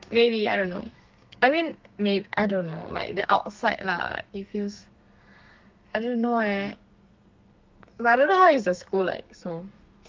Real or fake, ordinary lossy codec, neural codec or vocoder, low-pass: fake; Opus, 32 kbps; codec, 32 kHz, 1.9 kbps, SNAC; 7.2 kHz